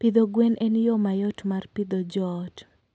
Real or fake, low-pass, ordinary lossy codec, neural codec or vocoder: real; none; none; none